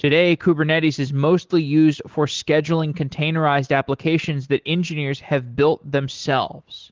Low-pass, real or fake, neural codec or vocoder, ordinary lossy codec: 7.2 kHz; real; none; Opus, 16 kbps